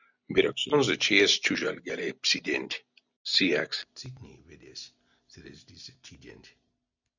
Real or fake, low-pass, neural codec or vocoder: real; 7.2 kHz; none